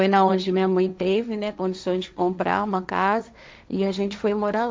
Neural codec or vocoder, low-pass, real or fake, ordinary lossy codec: codec, 16 kHz, 1.1 kbps, Voila-Tokenizer; none; fake; none